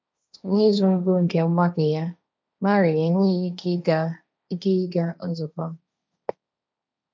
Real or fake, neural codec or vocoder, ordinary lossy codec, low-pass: fake; codec, 16 kHz, 1.1 kbps, Voila-Tokenizer; none; none